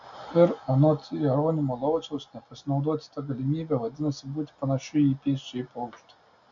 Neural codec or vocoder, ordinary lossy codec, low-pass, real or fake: none; MP3, 64 kbps; 7.2 kHz; real